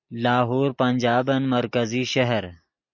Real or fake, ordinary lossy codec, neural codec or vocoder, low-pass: real; MP3, 48 kbps; none; 7.2 kHz